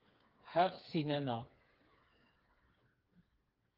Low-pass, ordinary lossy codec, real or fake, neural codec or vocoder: 5.4 kHz; Opus, 32 kbps; fake; codec, 16 kHz, 4 kbps, FreqCodec, smaller model